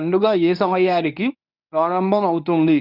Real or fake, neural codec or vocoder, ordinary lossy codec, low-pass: fake; codec, 24 kHz, 0.9 kbps, WavTokenizer, medium speech release version 1; none; 5.4 kHz